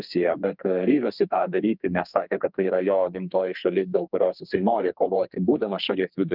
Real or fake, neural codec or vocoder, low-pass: fake; codec, 32 kHz, 1.9 kbps, SNAC; 5.4 kHz